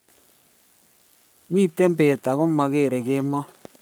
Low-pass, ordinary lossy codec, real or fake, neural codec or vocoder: none; none; fake; codec, 44.1 kHz, 3.4 kbps, Pupu-Codec